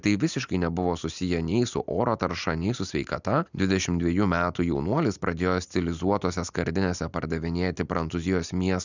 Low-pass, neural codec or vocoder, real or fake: 7.2 kHz; none; real